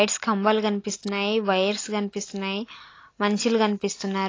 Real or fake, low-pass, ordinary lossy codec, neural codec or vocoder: real; 7.2 kHz; AAC, 32 kbps; none